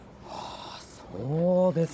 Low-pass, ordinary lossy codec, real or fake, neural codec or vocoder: none; none; fake; codec, 16 kHz, 16 kbps, FunCodec, trained on Chinese and English, 50 frames a second